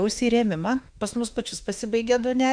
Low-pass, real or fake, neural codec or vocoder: 9.9 kHz; fake; autoencoder, 48 kHz, 32 numbers a frame, DAC-VAE, trained on Japanese speech